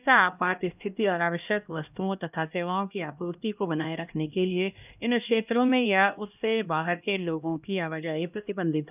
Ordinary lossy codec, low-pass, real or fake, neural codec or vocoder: none; 3.6 kHz; fake; codec, 16 kHz, 1 kbps, X-Codec, HuBERT features, trained on LibriSpeech